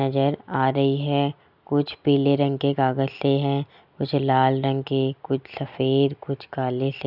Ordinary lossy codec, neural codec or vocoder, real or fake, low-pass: none; none; real; 5.4 kHz